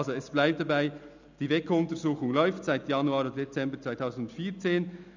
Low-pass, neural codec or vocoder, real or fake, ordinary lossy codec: 7.2 kHz; none; real; none